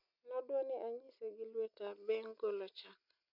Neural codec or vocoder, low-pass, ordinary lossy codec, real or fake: none; 5.4 kHz; none; real